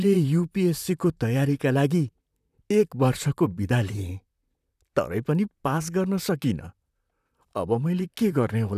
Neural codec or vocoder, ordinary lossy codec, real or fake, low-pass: vocoder, 44.1 kHz, 128 mel bands, Pupu-Vocoder; none; fake; 14.4 kHz